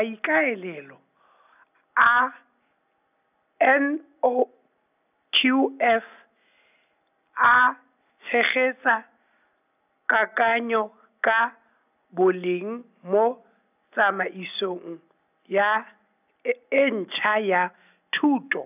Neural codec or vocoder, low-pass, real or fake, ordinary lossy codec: none; 3.6 kHz; real; none